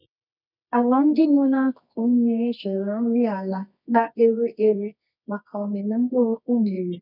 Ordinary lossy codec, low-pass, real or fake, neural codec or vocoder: none; 5.4 kHz; fake; codec, 24 kHz, 0.9 kbps, WavTokenizer, medium music audio release